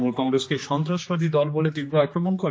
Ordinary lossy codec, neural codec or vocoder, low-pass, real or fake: none; codec, 16 kHz, 2 kbps, X-Codec, HuBERT features, trained on general audio; none; fake